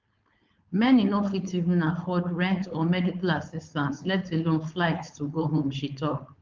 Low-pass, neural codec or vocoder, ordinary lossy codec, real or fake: 7.2 kHz; codec, 16 kHz, 4.8 kbps, FACodec; Opus, 32 kbps; fake